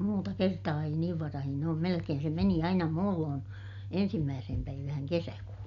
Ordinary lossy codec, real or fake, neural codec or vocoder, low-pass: none; real; none; 7.2 kHz